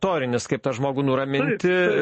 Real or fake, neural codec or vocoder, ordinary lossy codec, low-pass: real; none; MP3, 32 kbps; 9.9 kHz